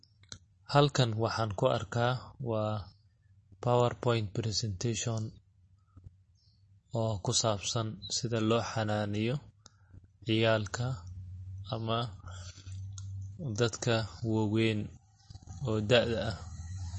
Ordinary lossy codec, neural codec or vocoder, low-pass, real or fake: MP3, 32 kbps; none; 10.8 kHz; real